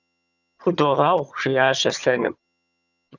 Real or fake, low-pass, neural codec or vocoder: fake; 7.2 kHz; vocoder, 22.05 kHz, 80 mel bands, HiFi-GAN